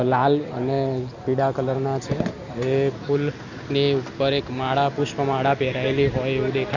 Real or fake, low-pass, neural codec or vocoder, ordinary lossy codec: real; 7.2 kHz; none; Opus, 64 kbps